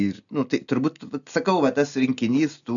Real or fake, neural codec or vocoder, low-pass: real; none; 7.2 kHz